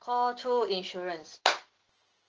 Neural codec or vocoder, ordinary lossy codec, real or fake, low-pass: none; Opus, 16 kbps; real; 7.2 kHz